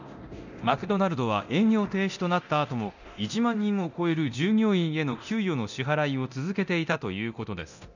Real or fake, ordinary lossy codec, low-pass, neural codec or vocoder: fake; none; 7.2 kHz; codec, 24 kHz, 0.9 kbps, DualCodec